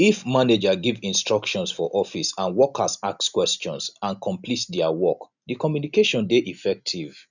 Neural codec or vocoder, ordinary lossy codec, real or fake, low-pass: none; none; real; 7.2 kHz